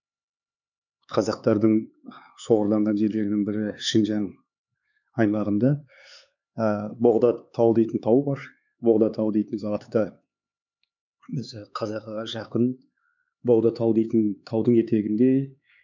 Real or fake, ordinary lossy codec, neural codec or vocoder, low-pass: fake; none; codec, 16 kHz, 4 kbps, X-Codec, HuBERT features, trained on LibriSpeech; 7.2 kHz